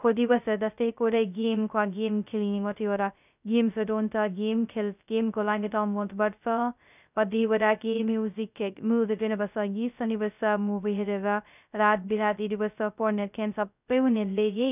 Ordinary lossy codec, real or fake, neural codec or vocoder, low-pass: none; fake; codec, 16 kHz, 0.2 kbps, FocalCodec; 3.6 kHz